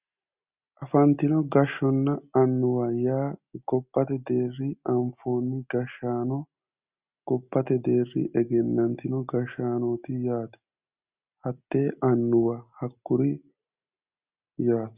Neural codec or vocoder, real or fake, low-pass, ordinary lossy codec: none; real; 3.6 kHz; Opus, 64 kbps